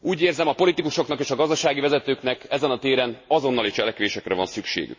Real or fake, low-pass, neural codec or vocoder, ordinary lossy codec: real; 7.2 kHz; none; MP3, 32 kbps